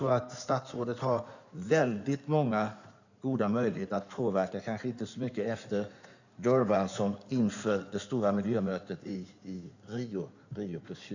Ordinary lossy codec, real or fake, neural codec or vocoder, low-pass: none; fake; codec, 16 kHz in and 24 kHz out, 2.2 kbps, FireRedTTS-2 codec; 7.2 kHz